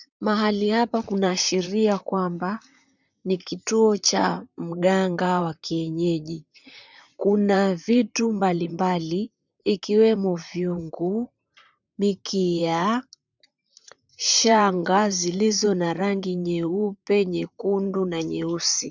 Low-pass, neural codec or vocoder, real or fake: 7.2 kHz; vocoder, 44.1 kHz, 128 mel bands, Pupu-Vocoder; fake